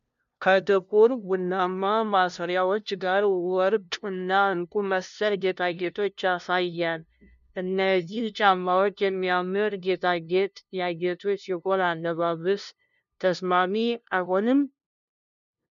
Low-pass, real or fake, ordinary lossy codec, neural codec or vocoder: 7.2 kHz; fake; MP3, 64 kbps; codec, 16 kHz, 0.5 kbps, FunCodec, trained on LibriTTS, 25 frames a second